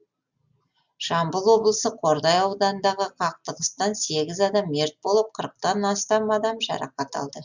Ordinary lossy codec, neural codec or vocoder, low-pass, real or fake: Opus, 64 kbps; none; 7.2 kHz; real